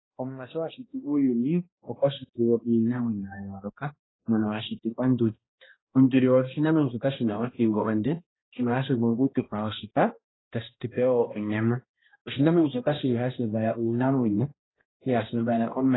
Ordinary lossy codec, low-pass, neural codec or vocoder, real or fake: AAC, 16 kbps; 7.2 kHz; codec, 16 kHz, 1 kbps, X-Codec, HuBERT features, trained on balanced general audio; fake